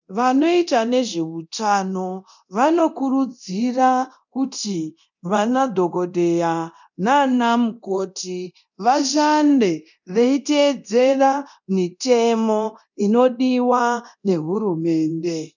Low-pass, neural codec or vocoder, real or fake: 7.2 kHz; codec, 24 kHz, 0.9 kbps, DualCodec; fake